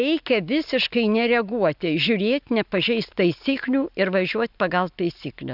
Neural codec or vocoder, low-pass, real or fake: none; 5.4 kHz; real